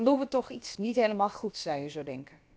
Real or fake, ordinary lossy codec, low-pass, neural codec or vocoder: fake; none; none; codec, 16 kHz, about 1 kbps, DyCAST, with the encoder's durations